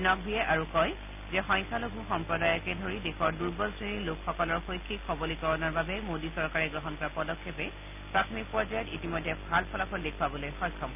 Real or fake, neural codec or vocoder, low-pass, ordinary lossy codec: real; none; 3.6 kHz; none